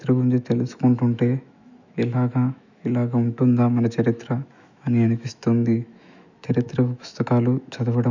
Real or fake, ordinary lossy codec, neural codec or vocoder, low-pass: fake; none; autoencoder, 48 kHz, 128 numbers a frame, DAC-VAE, trained on Japanese speech; 7.2 kHz